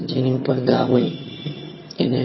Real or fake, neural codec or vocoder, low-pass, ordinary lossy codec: fake; vocoder, 22.05 kHz, 80 mel bands, HiFi-GAN; 7.2 kHz; MP3, 24 kbps